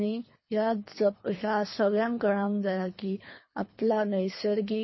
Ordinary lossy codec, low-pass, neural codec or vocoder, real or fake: MP3, 24 kbps; 7.2 kHz; codec, 24 kHz, 3 kbps, HILCodec; fake